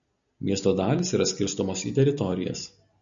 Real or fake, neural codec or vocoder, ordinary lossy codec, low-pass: real; none; MP3, 64 kbps; 7.2 kHz